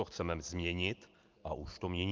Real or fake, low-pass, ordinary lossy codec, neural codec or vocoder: real; 7.2 kHz; Opus, 32 kbps; none